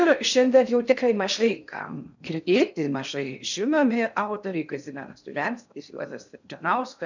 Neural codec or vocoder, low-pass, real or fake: codec, 16 kHz in and 24 kHz out, 0.6 kbps, FocalCodec, streaming, 2048 codes; 7.2 kHz; fake